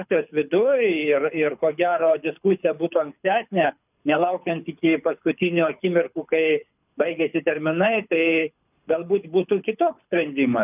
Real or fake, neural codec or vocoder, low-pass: fake; codec, 24 kHz, 6 kbps, HILCodec; 3.6 kHz